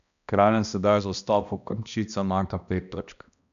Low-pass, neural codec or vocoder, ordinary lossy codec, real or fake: 7.2 kHz; codec, 16 kHz, 1 kbps, X-Codec, HuBERT features, trained on balanced general audio; none; fake